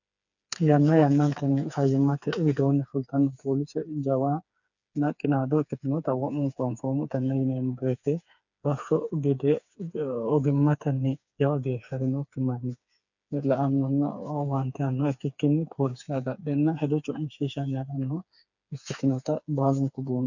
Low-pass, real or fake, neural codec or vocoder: 7.2 kHz; fake; codec, 16 kHz, 4 kbps, FreqCodec, smaller model